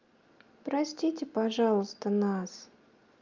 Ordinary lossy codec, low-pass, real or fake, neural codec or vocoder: Opus, 24 kbps; 7.2 kHz; real; none